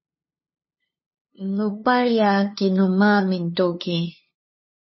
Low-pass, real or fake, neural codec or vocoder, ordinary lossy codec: 7.2 kHz; fake; codec, 16 kHz, 2 kbps, FunCodec, trained on LibriTTS, 25 frames a second; MP3, 24 kbps